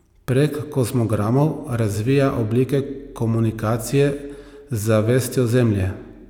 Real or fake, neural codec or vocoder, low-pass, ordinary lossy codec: real; none; 19.8 kHz; none